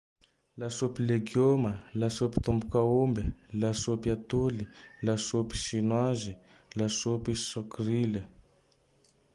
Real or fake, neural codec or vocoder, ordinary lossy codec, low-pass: real; none; Opus, 24 kbps; 10.8 kHz